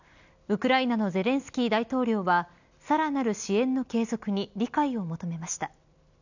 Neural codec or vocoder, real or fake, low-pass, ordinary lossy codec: none; real; 7.2 kHz; none